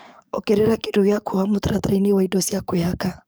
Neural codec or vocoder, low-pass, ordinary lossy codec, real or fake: codec, 44.1 kHz, 7.8 kbps, DAC; none; none; fake